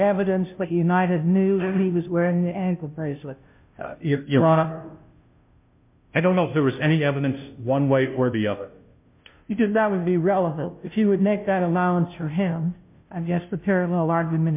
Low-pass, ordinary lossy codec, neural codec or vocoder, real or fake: 3.6 kHz; MP3, 32 kbps; codec, 16 kHz, 0.5 kbps, FunCodec, trained on Chinese and English, 25 frames a second; fake